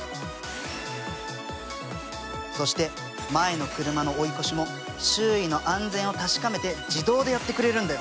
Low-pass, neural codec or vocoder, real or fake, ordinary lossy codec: none; none; real; none